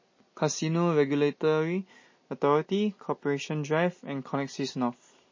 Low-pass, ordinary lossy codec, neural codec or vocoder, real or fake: 7.2 kHz; MP3, 32 kbps; none; real